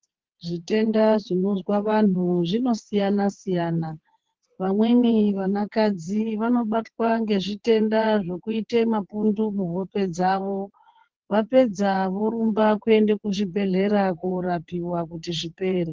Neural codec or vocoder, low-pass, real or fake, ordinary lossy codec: vocoder, 22.05 kHz, 80 mel bands, WaveNeXt; 7.2 kHz; fake; Opus, 16 kbps